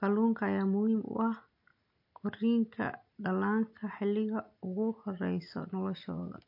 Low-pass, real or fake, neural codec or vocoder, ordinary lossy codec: 5.4 kHz; real; none; MP3, 32 kbps